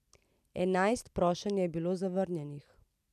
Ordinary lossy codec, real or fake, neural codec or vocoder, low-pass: none; real; none; 14.4 kHz